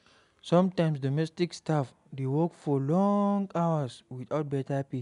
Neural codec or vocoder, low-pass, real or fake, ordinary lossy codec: none; 10.8 kHz; real; none